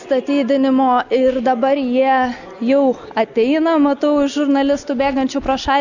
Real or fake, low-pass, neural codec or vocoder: real; 7.2 kHz; none